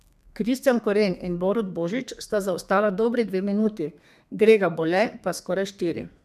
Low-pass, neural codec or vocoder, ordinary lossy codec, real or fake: 14.4 kHz; codec, 32 kHz, 1.9 kbps, SNAC; none; fake